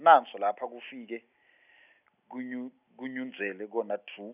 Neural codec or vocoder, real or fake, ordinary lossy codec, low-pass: none; real; none; 3.6 kHz